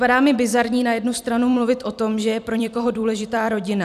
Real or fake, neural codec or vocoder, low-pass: fake; vocoder, 44.1 kHz, 128 mel bands every 256 samples, BigVGAN v2; 14.4 kHz